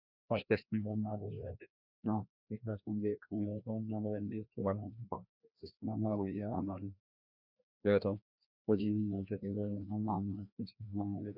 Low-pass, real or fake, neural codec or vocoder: 5.4 kHz; fake; codec, 16 kHz, 1 kbps, FreqCodec, larger model